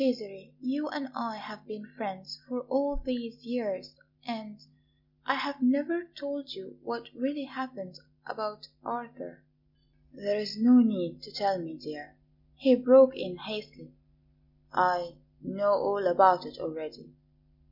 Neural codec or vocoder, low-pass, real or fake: none; 5.4 kHz; real